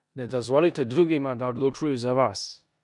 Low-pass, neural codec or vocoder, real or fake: 10.8 kHz; codec, 16 kHz in and 24 kHz out, 0.9 kbps, LongCat-Audio-Codec, four codebook decoder; fake